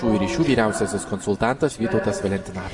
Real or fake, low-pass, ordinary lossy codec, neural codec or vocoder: real; 10.8 kHz; MP3, 48 kbps; none